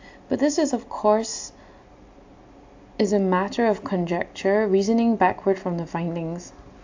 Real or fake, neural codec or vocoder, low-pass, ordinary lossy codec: real; none; 7.2 kHz; AAC, 48 kbps